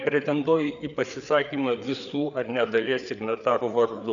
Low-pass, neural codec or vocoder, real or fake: 7.2 kHz; codec, 16 kHz, 4 kbps, FreqCodec, larger model; fake